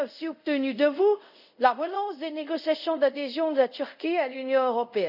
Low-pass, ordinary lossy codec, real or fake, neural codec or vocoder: 5.4 kHz; none; fake; codec, 24 kHz, 0.5 kbps, DualCodec